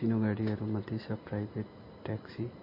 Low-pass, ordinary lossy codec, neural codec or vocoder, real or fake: 5.4 kHz; MP3, 24 kbps; none; real